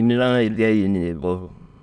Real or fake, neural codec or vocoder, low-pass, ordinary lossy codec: fake; autoencoder, 22.05 kHz, a latent of 192 numbers a frame, VITS, trained on many speakers; none; none